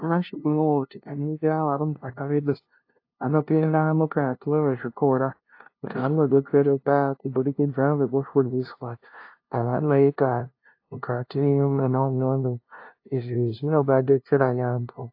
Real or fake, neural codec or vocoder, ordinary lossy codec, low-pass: fake; codec, 16 kHz, 0.5 kbps, FunCodec, trained on LibriTTS, 25 frames a second; AAC, 32 kbps; 5.4 kHz